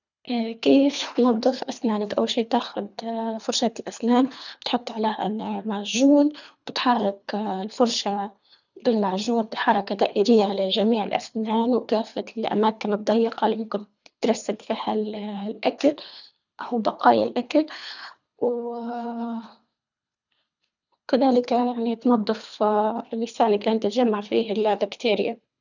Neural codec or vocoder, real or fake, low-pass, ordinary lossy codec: codec, 24 kHz, 3 kbps, HILCodec; fake; 7.2 kHz; none